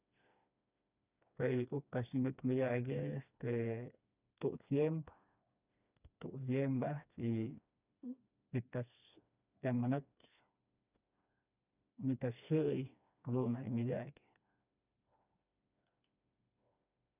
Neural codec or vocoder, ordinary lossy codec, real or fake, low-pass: codec, 16 kHz, 2 kbps, FreqCodec, smaller model; none; fake; 3.6 kHz